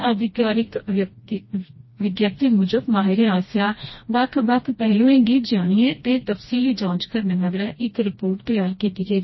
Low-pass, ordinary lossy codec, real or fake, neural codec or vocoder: 7.2 kHz; MP3, 24 kbps; fake; codec, 16 kHz, 1 kbps, FreqCodec, smaller model